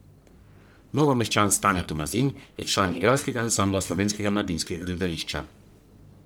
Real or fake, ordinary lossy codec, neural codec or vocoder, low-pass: fake; none; codec, 44.1 kHz, 1.7 kbps, Pupu-Codec; none